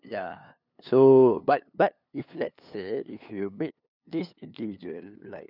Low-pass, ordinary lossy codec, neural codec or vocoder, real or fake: 5.4 kHz; none; codec, 16 kHz, 2 kbps, FunCodec, trained on LibriTTS, 25 frames a second; fake